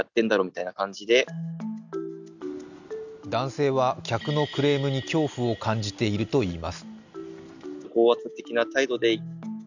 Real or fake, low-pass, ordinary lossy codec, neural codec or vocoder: real; 7.2 kHz; none; none